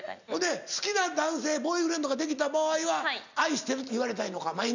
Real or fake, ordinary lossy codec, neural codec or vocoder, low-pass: real; none; none; 7.2 kHz